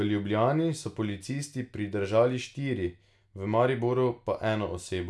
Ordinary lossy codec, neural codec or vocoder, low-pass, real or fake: none; none; none; real